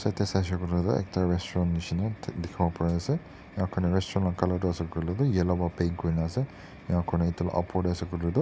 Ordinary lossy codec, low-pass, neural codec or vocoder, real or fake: none; none; none; real